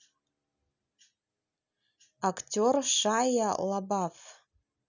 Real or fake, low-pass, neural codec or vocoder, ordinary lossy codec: real; 7.2 kHz; none; none